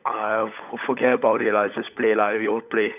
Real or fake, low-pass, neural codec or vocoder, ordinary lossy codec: fake; 3.6 kHz; codec, 16 kHz, 16 kbps, FunCodec, trained on LibriTTS, 50 frames a second; none